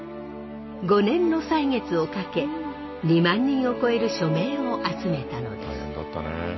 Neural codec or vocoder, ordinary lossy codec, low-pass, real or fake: none; MP3, 24 kbps; 7.2 kHz; real